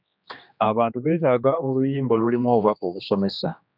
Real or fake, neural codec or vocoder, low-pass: fake; codec, 16 kHz, 2 kbps, X-Codec, HuBERT features, trained on general audio; 5.4 kHz